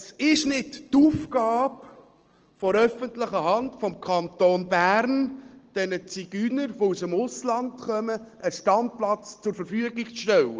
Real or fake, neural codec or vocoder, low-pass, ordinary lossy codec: real; none; 7.2 kHz; Opus, 16 kbps